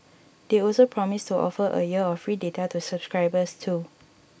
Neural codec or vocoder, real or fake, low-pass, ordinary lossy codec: none; real; none; none